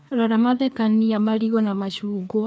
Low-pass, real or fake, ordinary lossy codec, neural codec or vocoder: none; fake; none; codec, 16 kHz, 2 kbps, FreqCodec, larger model